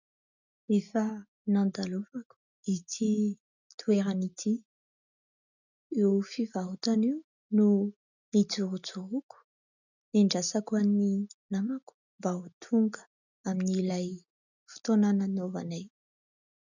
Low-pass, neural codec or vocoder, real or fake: 7.2 kHz; none; real